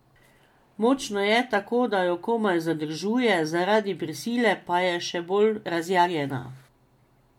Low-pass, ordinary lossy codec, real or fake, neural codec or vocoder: 19.8 kHz; MP3, 96 kbps; real; none